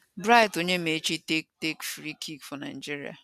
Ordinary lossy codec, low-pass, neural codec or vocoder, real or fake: none; 14.4 kHz; none; real